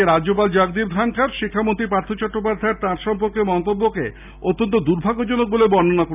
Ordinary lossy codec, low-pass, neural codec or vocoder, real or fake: none; 3.6 kHz; none; real